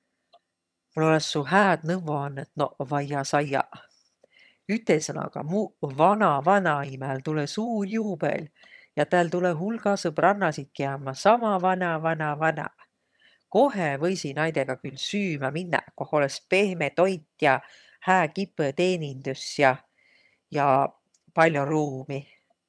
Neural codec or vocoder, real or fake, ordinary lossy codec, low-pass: vocoder, 22.05 kHz, 80 mel bands, HiFi-GAN; fake; none; none